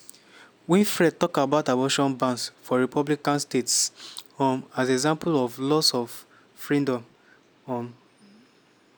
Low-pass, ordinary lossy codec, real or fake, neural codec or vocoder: none; none; real; none